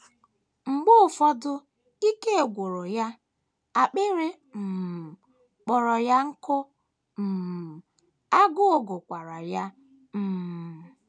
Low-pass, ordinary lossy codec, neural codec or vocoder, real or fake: 9.9 kHz; none; none; real